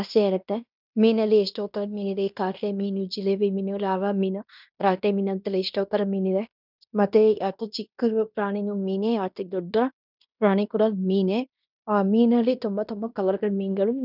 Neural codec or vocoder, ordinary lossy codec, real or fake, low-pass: codec, 16 kHz in and 24 kHz out, 0.9 kbps, LongCat-Audio-Codec, fine tuned four codebook decoder; none; fake; 5.4 kHz